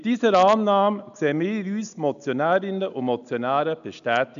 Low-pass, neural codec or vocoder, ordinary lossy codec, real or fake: 7.2 kHz; none; MP3, 96 kbps; real